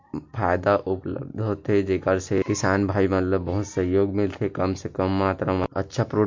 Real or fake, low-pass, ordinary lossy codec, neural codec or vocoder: real; 7.2 kHz; MP3, 48 kbps; none